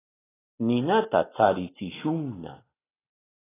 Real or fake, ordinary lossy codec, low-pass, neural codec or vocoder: real; AAC, 16 kbps; 3.6 kHz; none